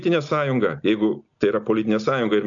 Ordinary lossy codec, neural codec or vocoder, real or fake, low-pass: AAC, 64 kbps; none; real; 7.2 kHz